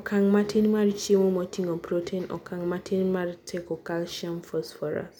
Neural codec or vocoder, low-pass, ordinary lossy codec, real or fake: none; 19.8 kHz; none; real